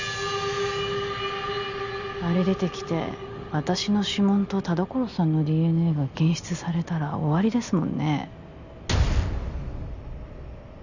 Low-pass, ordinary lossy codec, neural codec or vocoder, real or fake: 7.2 kHz; none; none; real